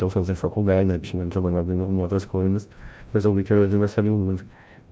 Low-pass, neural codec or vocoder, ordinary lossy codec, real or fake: none; codec, 16 kHz, 0.5 kbps, FreqCodec, larger model; none; fake